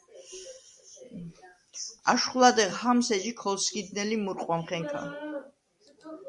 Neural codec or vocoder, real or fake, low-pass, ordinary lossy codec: none; real; 10.8 kHz; Opus, 64 kbps